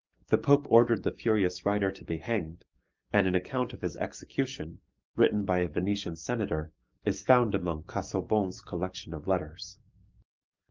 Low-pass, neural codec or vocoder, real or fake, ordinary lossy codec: 7.2 kHz; codec, 44.1 kHz, 7.8 kbps, DAC; fake; Opus, 24 kbps